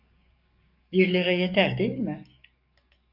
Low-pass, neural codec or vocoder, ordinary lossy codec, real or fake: 5.4 kHz; codec, 44.1 kHz, 7.8 kbps, DAC; Opus, 64 kbps; fake